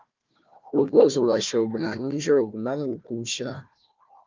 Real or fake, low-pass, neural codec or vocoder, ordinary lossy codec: fake; 7.2 kHz; codec, 16 kHz, 1 kbps, FunCodec, trained on Chinese and English, 50 frames a second; Opus, 24 kbps